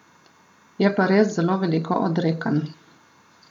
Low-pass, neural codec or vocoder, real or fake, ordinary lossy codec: 19.8 kHz; none; real; none